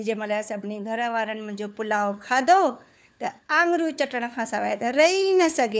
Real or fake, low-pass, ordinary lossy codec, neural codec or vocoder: fake; none; none; codec, 16 kHz, 4 kbps, FunCodec, trained on LibriTTS, 50 frames a second